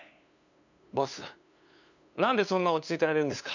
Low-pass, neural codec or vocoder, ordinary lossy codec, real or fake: 7.2 kHz; codec, 16 kHz, 2 kbps, FunCodec, trained on LibriTTS, 25 frames a second; none; fake